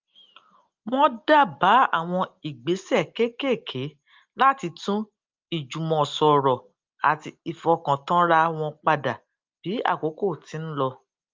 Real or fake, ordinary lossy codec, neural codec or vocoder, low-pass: real; Opus, 24 kbps; none; 7.2 kHz